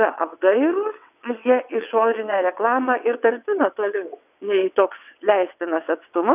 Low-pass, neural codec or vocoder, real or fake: 3.6 kHz; vocoder, 22.05 kHz, 80 mel bands, WaveNeXt; fake